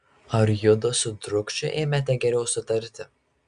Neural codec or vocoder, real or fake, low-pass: none; real; 9.9 kHz